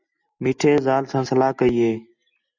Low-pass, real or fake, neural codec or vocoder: 7.2 kHz; real; none